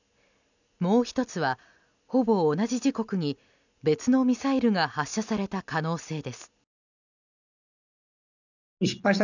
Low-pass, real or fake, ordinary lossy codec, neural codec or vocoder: 7.2 kHz; real; none; none